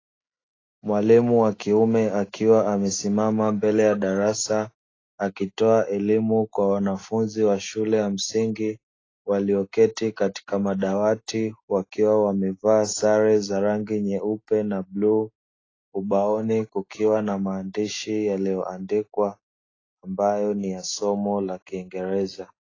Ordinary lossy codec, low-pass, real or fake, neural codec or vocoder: AAC, 32 kbps; 7.2 kHz; real; none